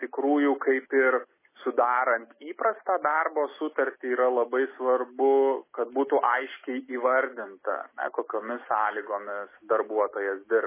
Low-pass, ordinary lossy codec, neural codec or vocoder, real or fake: 3.6 kHz; MP3, 16 kbps; none; real